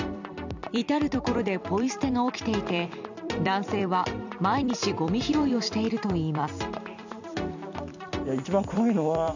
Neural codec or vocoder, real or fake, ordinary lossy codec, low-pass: vocoder, 44.1 kHz, 128 mel bands every 256 samples, BigVGAN v2; fake; none; 7.2 kHz